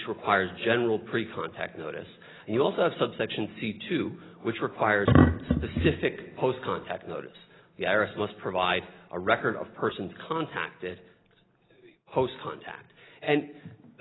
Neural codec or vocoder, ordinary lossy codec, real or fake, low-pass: none; AAC, 16 kbps; real; 7.2 kHz